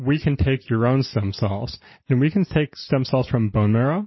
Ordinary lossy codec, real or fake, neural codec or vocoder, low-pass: MP3, 24 kbps; real; none; 7.2 kHz